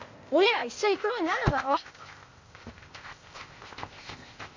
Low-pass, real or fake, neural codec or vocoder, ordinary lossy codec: 7.2 kHz; fake; codec, 16 kHz, 0.8 kbps, ZipCodec; AAC, 48 kbps